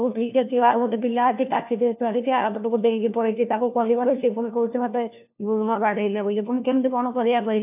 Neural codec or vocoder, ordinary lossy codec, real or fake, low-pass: codec, 16 kHz, 1 kbps, FunCodec, trained on LibriTTS, 50 frames a second; AAC, 32 kbps; fake; 3.6 kHz